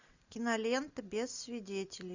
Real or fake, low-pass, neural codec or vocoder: real; 7.2 kHz; none